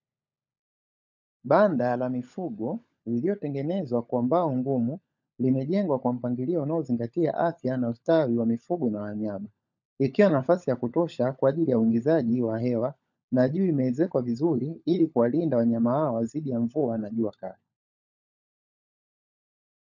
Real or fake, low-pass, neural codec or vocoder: fake; 7.2 kHz; codec, 16 kHz, 16 kbps, FunCodec, trained on LibriTTS, 50 frames a second